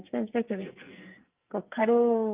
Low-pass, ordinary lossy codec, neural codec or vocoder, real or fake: 3.6 kHz; Opus, 24 kbps; codec, 44.1 kHz, 2.6 kbps, SNAC; fake